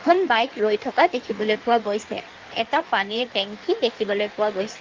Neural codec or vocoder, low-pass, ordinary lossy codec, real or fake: codec, 16 kHz in and 24 kHz out, 1.1 kbps, FireRedTTS-2 codec; 7.2 kHz; Opus, 24 kbps; fake